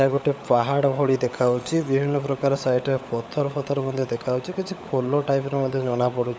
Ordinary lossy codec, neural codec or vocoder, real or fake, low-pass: none; codec, 16 kHz, 16 kbps, FunCodec, trained on LibriTTS, 50 frames a second; fake; none